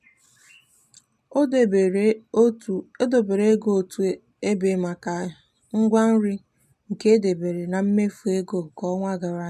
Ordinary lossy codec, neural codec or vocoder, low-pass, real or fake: none; none; 14.4 kHz; real